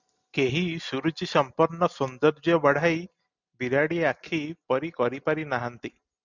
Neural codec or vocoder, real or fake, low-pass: none; real; 7.2 kHz